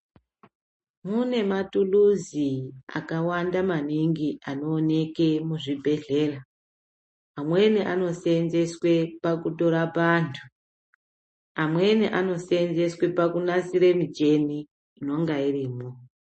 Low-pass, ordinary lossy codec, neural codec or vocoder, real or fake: 10.8 kHz; MP3, 32 kbps; none; real